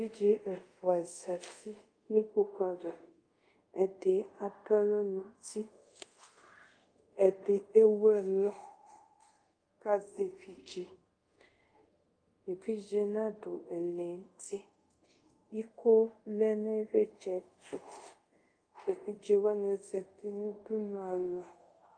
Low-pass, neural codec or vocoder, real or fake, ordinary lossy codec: 9.9 kHz; codec, 24 kHz, 0.5 kbps, DualCodec; fake; Opus, 32 kbps